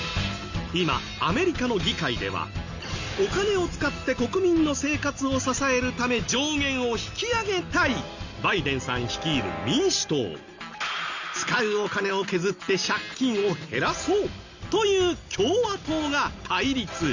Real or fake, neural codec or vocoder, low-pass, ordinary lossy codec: real; none; 7.2 kHz; Opus, 64 kbps